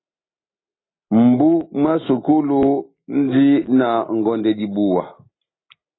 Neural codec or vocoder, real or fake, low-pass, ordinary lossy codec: none; real; 7.2 kHz; AAC, 16 kbps